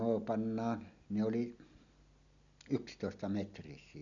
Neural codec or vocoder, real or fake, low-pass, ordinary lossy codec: none; real; 7.2 kHz; none